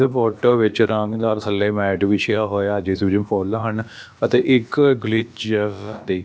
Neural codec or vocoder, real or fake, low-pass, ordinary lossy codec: codec, 16 kHz, about 1 kbps, DyCAST, with the encoder's durations; fake; none; none